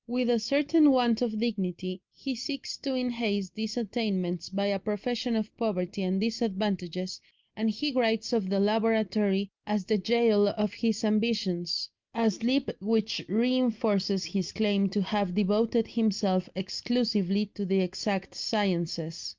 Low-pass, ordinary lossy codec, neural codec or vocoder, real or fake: 7.2 kHz; Opus, 24 kbps; none; real